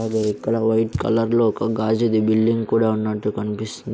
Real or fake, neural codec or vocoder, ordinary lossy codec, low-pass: real; none; none; none